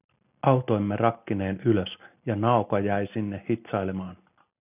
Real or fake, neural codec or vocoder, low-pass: real; none; 3.6 kHz